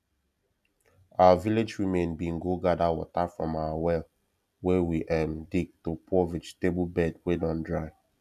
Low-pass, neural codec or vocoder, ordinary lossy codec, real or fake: 14.4 kHz; none; none; real